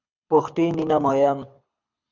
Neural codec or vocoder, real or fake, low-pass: codec, 24 kHz, 6 kbps, HILCodec; fake; 7.2 kHz